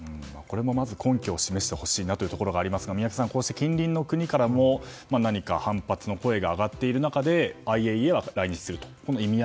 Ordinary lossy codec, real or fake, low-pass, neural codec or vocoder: none; real; none; none